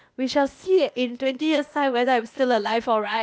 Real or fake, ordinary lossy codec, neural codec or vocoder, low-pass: fake; none; codec, 16 kHz, 0.8 kbps, ZipCodec; none